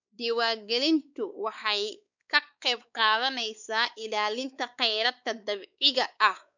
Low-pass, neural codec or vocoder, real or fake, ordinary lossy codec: 7.2 kHz; codec, 16 kHz, 4 kbps, X-Codec, WavLM features, trained on Multilingual LibriSpeech; fake; none